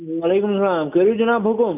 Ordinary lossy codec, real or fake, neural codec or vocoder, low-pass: none; real; none; 3.6 kHz